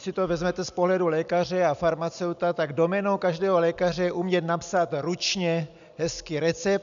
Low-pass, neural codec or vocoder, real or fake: 7.2 kHz; none; real